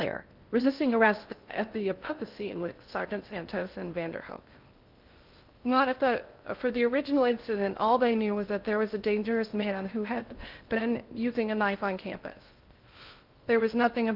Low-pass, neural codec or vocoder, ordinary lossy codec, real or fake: 5.4 kHz; codec, 16 kHz in and 24 kHz out, 0.6 kbps, FocalCodec, streaming, 2048 codes; Opus, 32 kbps; fake